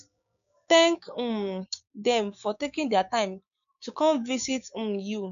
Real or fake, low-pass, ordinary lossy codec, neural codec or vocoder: fake; 7.2 kHz; none; codec, 16 kHz, 6 kbps, DAC